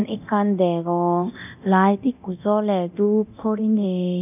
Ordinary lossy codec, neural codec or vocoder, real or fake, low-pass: none; codec, 24 kHz, 0.9 kbps, DualCodec; fake; 3.6 kHz